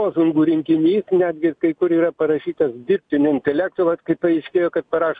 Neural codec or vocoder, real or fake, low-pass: none; real; 9.9 kHz